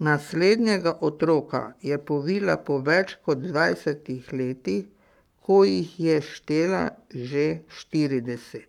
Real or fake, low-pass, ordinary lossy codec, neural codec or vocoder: fake; 19.8 kHz; none; codec, 44.1 kHz, 7.8 kbps, Pupu-Codec